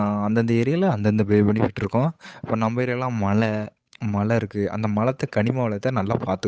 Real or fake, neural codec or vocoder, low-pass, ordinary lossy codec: fake; codec, 16 kHz, 8 kbps, FunCodec, trained on Chinese and English, 25 frames a second; none; none